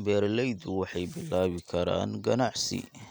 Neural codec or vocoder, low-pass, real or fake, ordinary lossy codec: none; none; real; none